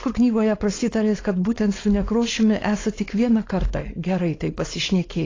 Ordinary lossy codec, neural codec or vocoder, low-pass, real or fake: AAC, 32 kbps; codec, 16 kHz, 4.8 kbps, FACodec; 7.2 kHz; fake